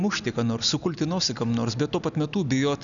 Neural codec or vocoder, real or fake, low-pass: none; real; 7.2 kHz